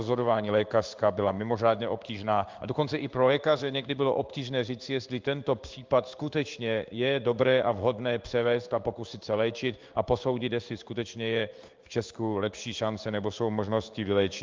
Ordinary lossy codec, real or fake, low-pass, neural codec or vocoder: Opus, 24 kbps; fake; 7.2 kHz; codec, 16 kHz in and 24 kHz out, 1 kbps, XY-Tokenizer